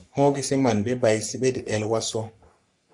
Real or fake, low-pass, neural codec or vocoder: fake; 10.8 kHz; codec, 44.1 kHz, 3.4 kbps, Pupu-Codec